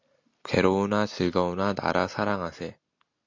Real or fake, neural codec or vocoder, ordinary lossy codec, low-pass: real; none; MP3, 48 kbps; 7.2 kHz